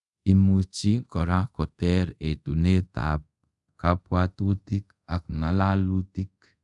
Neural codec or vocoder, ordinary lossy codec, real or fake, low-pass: codec, 24 kHz, 0.5 kbps, DualCodec; none; fake; 10.8 kHz